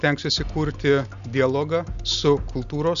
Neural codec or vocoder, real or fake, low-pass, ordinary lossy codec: none; real; 7.2 kHz; Opus, 64 kbps